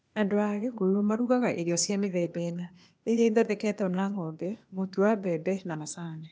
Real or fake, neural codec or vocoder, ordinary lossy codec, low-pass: fake; codec, 16 kHz, 0.8 kbps, ZipCodec; none; none